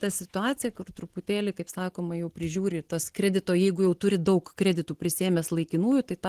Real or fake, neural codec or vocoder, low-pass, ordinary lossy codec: real; none; 14.4 kHz; Opus, 16 kbps